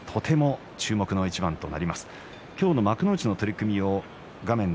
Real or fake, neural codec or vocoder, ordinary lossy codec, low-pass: real; none; none; none